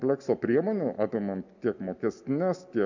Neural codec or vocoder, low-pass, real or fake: none; 7.2 kHz; real